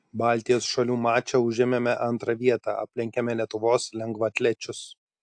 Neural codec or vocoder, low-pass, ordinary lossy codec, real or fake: none; 9.9 kHz; AAC, 64 kbps; real